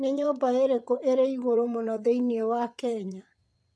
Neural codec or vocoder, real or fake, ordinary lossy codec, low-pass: vocoder, 22.05 kHz, 80 mel bands, WaveNeXt; fake; none; none